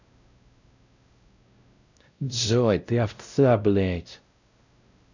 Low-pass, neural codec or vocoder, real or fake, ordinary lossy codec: 7.2 kHz; codec, 16 kHz, 0.5 kbps, X-Codec, WavLM features, trained on Multilingual LibriSpeech; fake; none